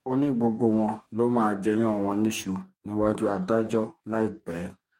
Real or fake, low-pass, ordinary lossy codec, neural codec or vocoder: fake; 19.8 kHz; MP3, 64 kbps; codec, 44.1 kHz, 2.6 kbps, DAC